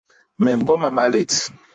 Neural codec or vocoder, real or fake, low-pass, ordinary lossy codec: codec, 16 kHz in and 24 kHz out, 1.1 kbps, FireRedTTS-2 codec; fake; 9.9 kHz; MP3, 64 kbps